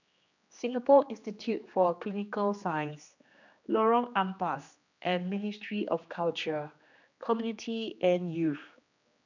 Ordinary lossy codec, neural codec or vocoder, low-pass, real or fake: none; codec, 16 kHz, 2 kbps, X-Codec, HuBERT features, trained on general audio; 7.2 kHz; fake